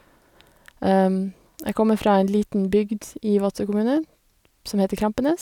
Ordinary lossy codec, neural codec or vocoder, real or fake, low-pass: none; none; real; 19.8 kHz